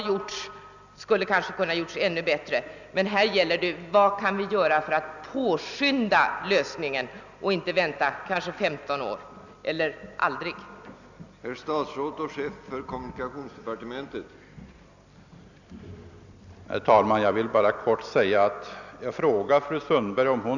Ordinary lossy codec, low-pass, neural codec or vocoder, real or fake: none; 7.2 kHz; none; real